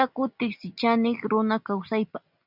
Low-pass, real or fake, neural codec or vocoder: 5.4 kHz; real; none